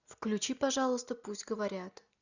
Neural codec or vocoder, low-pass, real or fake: none; 7.2 kHz; real